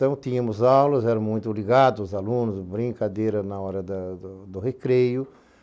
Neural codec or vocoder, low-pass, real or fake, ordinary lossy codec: none; none; real; none